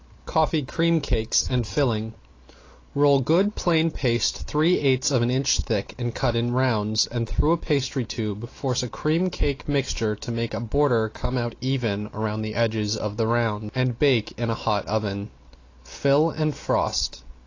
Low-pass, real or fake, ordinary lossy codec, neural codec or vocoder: 7.2 kHz; real; AAC, 32 kbps; none